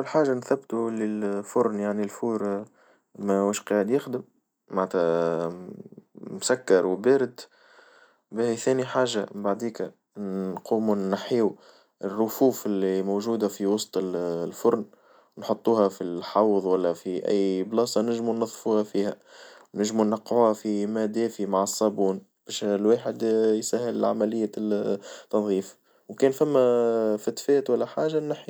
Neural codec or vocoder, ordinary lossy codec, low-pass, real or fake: none; none; none; real